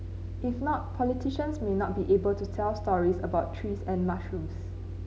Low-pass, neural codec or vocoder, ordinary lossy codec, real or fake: none; none; none; real